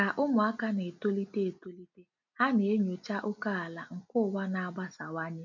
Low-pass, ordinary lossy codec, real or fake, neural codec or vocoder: 7.2 kHz; none; real; none